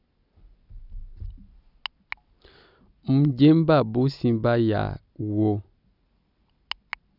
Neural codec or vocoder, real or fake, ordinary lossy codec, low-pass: none; real; none; 5.4 kHz